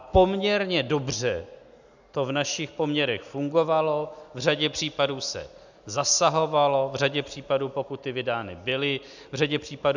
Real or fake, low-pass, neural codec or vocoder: real; 7.2 kHz; none